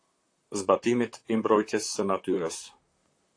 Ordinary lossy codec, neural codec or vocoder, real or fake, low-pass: AAC, 48 kbps; vocoder, 44.1 kHz, 128 mel bands, Pupu-Vocoder; fake; 9.9 kHz